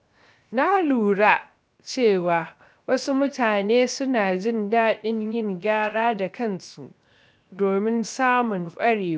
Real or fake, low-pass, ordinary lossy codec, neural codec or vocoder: fake; none; none; codec, 16 kHz, 0.7 kbps, FocalCodec